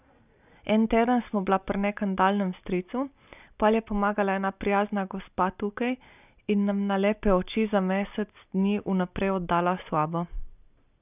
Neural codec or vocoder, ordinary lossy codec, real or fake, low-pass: none; none; real; 3.6 kHz